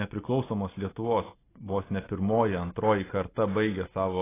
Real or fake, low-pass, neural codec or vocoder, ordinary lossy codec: real; 3.6 kHz; none; AAC, 16 kbps